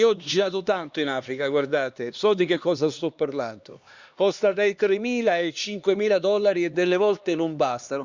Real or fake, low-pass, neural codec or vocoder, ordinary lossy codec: fake; 7.2 kHz; codec, 16 kHz, 2 kbps, X-Codec, HuBERT features, trained on LibriSpeech; Opus, 64 kbps